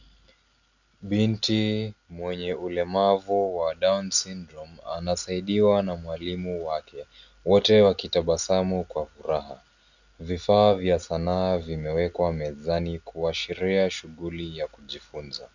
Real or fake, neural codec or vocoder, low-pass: real; none; 7.2 kHz